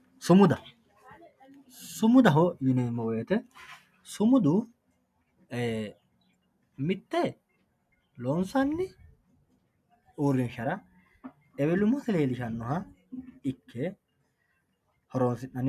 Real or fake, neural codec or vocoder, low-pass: real; none; 14.4 kHz